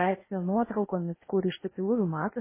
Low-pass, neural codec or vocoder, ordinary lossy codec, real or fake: 3.6 kHz; codec, 16 kHz in and 24 kHz out, 0.8 kbps, FocalCodec, streaming, 65536 codes; MP3, 16 kbps; fake